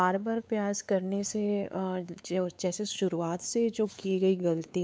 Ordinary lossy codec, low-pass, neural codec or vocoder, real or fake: none; none; codec, 16 kHz, 4 kbps, X-Codec, WavLM features, trained on Multilingual LibriSpeech; fake